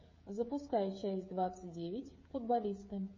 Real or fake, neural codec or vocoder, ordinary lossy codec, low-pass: fake; codec, 16 kHz, 16 kbps, FreqCodec, smaller model; MP3, 32 kbps; 7.2 kHz